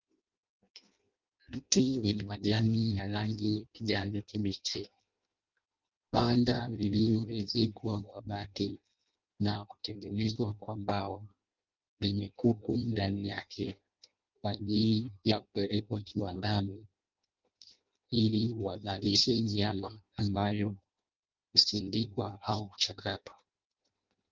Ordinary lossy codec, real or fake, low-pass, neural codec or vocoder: Opus, 24 kbps; fake; 7.2 kHz; codec, 16 kHz in and 24 kHz out, 0.6 kbps, FireRedTTS-2 codec